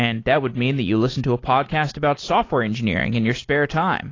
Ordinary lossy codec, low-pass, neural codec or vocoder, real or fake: AAC, 32 kbps; 7.2 kHz; none; real